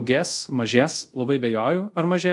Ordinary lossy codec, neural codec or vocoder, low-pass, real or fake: AAC, 64 kbps; codec, 24 kHz, 0.5 kbps, DualCodec; 10.8 kHz; fake